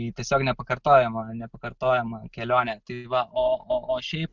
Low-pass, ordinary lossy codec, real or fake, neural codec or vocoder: 7.2 kHz; Opus, 64 kbps; real; none